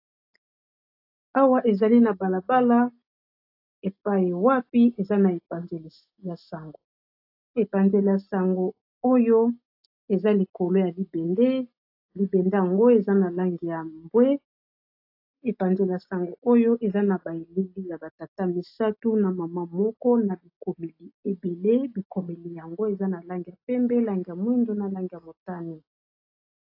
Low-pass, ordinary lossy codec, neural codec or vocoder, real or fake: 5.4 kHz; AAC, 32 kbps; none; real